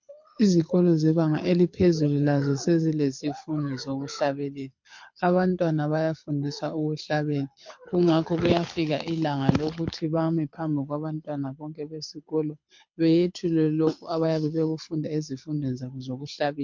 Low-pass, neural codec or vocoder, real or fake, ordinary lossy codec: 7.2 kHz; codec, 24 kHz, 6 kbps, HILCodec; fake; MP3, 48 kbps